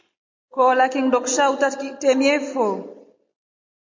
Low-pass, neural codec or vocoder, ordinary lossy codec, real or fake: 7.2 kHz; none; MP3, 48 kbps; real